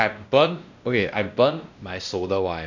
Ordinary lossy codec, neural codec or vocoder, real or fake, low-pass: none; codec, 16 kHz, 1 kbps, X-Codec, WavLM features, trained on Multilingual LibriSpeech; fake; 7.2 kHz